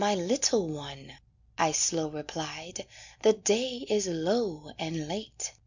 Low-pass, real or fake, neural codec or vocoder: 7.2 kHz; real; none